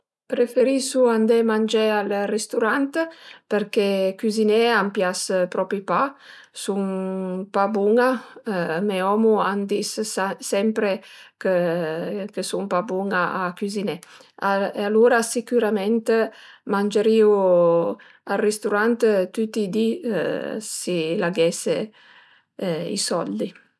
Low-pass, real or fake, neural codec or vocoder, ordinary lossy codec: none; real; none; none